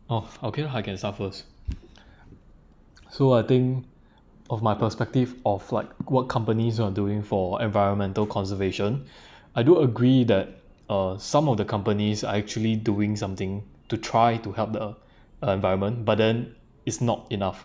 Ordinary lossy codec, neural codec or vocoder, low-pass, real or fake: none; none; none; real